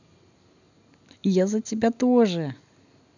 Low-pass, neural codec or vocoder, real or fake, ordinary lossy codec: 7.2 kHz; none; real; none